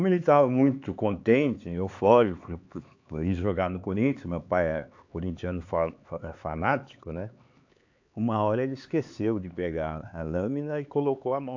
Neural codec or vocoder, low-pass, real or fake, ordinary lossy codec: codec, 16 kHz, 4 kbps, X-Codec, HuBERT features, trained on LibriSpeech; 7.2 kHz; fake; AAC, 48 kbps